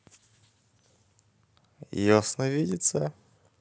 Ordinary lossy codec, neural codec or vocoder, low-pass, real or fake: none; none; none; real